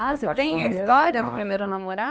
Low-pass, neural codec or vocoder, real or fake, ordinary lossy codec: none; codec, 16 kHz, 2 kbps, X-Codec, HuBERT features, trained on LibriSpeech; fake; none